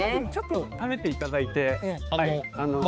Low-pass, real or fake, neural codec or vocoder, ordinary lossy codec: none; fake; codec, 16 kHz, 4 kbps, X-Codec, HuBERT features, trained on balanced general audio; none